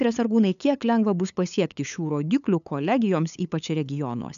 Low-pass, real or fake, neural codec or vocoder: 7.2 kHz; fake; codec, 16 kHz, 8 kbps, FunCodec, trained on LibriTTS, 25 frames a second